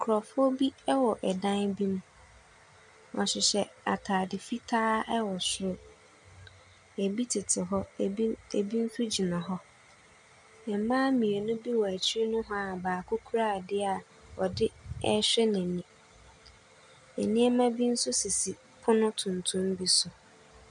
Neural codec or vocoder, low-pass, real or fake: none; 10.8 kHz; real